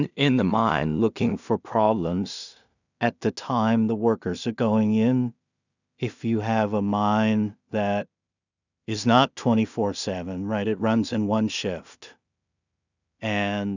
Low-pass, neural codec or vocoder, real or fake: 7.2 kHz; codec, 16 kHz in and 24 kHz out, 0.4 kbps, LongCat-Audio-Codec, two codebook decoder; fake